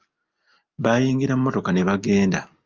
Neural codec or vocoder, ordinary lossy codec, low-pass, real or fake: none; Opus, 24 kbps; 7.2 kHz; real